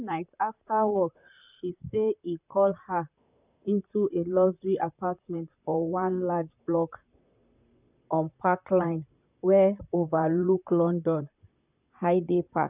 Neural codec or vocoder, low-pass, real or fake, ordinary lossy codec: vocoder, 44.1 kHz, 128 mel bands, Pupu-Vocoder; 3.6 kHz; fake; none